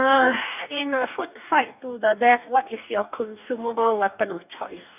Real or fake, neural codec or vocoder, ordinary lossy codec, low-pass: fake; codec, 44.1 kHz, 2.6 kbps, DAC; none; 3.6 kHz